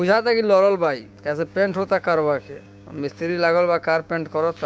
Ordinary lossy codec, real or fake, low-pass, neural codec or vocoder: none; fake; none; codec, 16 kHz, 6 kbps, DAC